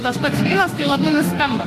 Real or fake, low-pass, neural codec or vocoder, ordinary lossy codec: fake; 14.4 kHz; autoencoder, 48 kHz, 32 numbers a frame, DAC-VAE, trained on Japanese speech; AAC, 64 kbps